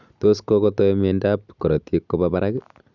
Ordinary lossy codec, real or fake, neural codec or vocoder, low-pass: none; real; none; 7.2 kHz